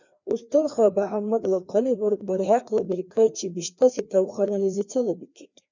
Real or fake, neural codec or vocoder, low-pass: fake; codec, 16 kHz, 2 kbps, FreqCodec, larger model; 7.2 kHz